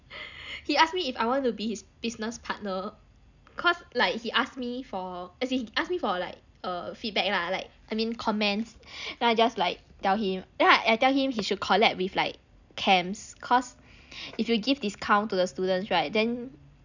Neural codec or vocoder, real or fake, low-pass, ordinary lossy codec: none; real; 7.2 kHz; none